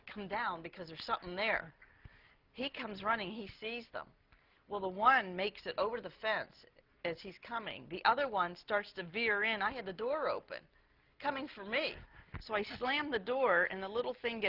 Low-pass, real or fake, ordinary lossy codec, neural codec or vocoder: 5.4 kHz; real; Opus, 32 kbps; none